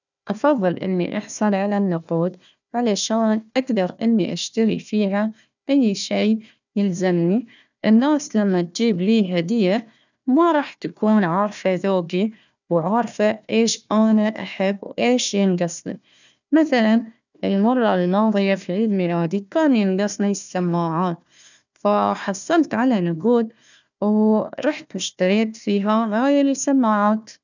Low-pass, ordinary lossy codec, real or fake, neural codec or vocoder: 7.2 kHz; none; fake; codec, 16 kHz, 1 kbps, FunCodec, trained on Chinese and English, 50 frames a second